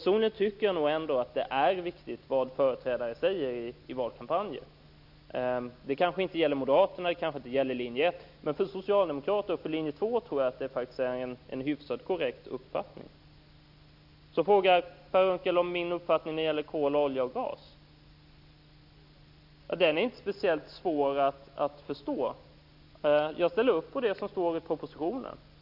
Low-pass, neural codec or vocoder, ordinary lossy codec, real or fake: 5.4 kHz; none; none; real